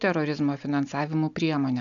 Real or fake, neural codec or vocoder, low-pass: real; none; 7.2 kHz